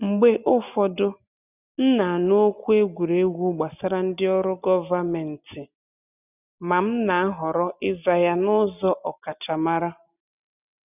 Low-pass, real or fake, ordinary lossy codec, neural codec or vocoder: 3.6 kHz; real; none; none